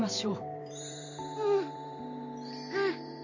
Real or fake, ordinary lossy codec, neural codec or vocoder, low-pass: fake; AAC, 48 kbps; vocoder, 44.1 kHz, 128 mel bands every 512 samples, BigVGAN v2; 7.2 kHz